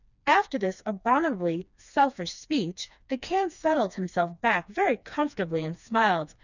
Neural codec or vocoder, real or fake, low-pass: codec, 16 kHz, 2 kbps, FreqCodec, smaller model; fake; 7.2 kHz